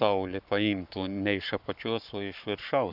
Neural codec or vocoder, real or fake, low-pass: codec, 44.1 kHz, 7.8 kbps, Pupu-Codec; fake; 5.4 kHz